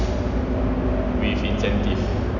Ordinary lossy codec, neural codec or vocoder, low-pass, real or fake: none; none; 7.2 kHz; real